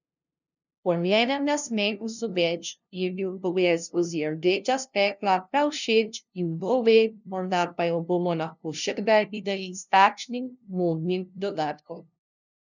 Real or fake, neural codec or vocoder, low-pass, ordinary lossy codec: fake; codec, 16 kHz, 0.5 kbps, FunCodec, trained on LibriTTS, 25 frames a second; 7.2 kHz; none